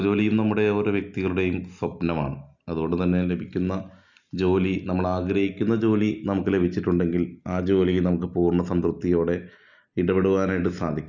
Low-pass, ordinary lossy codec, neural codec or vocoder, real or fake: 7.2 kHz; none; none; real